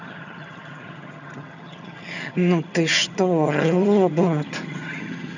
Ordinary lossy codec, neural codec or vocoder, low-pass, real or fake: none; vocoder, 22.05 kHz, 80 mel bands, HiFi-GAN; 7.2 kHz; fake